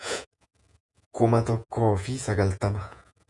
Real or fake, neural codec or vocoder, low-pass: fake; vocoder, 48 kHz, 128 mel bands, Vocos; 10.8 kHz